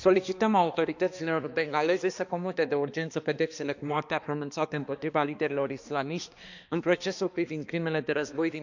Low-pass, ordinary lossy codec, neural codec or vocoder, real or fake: 7.2 kHz; none; codec, 16 kHz, 2 kbps, X-Codec, HuBERT features, trained on balanced general audio; fake